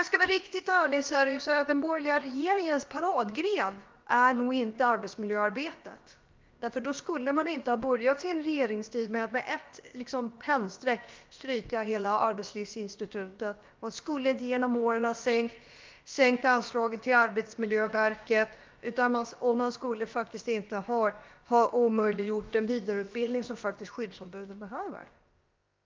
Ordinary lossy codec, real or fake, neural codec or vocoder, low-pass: Opus, 32 kbps; fake; codec, 16 kHz, about 1 kbps, DyCAST, with the encoder's durations; 7.2 kHz